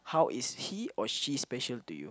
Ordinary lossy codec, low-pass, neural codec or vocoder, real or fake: none; none; none; real